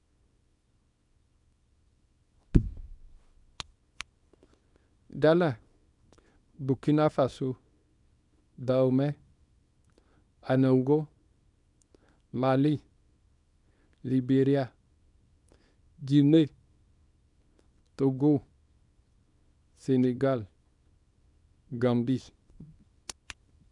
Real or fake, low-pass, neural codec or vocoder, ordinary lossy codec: fake; 10.8 kHz; codec, 24 kHz, 0.9 kbps, WavTokenizer, small release; none